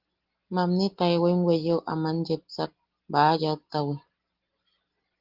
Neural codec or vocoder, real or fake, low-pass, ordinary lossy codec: none; real; 5.4 kHz; Opus, 16 kbps